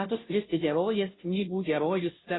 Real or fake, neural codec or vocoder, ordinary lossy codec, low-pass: fake; codec, 16 kHz, 0.5 kbps, FunCodec, trained on Chinese and English, 25 frames a second; AAC, 16 kbps; 7.2 kHz